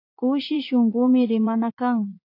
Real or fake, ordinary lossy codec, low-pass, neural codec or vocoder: fake; AAC, 32 kbps; 5.4 kHz; vocoder, 44.1 kHz, 80 mel bands, Vocos